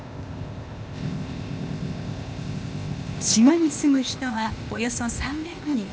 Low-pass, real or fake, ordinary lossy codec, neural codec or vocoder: none; fake; none; codec, 16 kHz, 0.8 kbps, ZipCodec